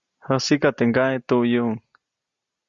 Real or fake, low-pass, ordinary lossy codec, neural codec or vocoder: real; 7.2 kHz; Opus, 64 kbps; none